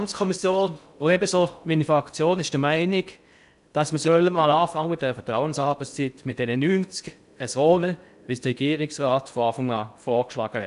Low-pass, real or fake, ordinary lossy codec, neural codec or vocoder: 10.8 kHz; fake; none; codec, 16 kHz in and 24 kHz out, 0.6 kbps, FocalCodec, streaming, 2048 codes